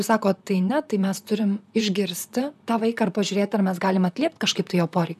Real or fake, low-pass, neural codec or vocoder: fake; 14.4 kHz; vocoder, 44.1 kHz, 128 mel bands, Pupu-Vocoder